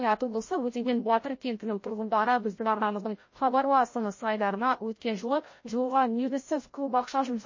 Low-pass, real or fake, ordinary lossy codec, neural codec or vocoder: 7.2 kHz; fake; MP3, 32 kbps; codec, 16 kHz, 0.5 kbps, FreqCodec, larger model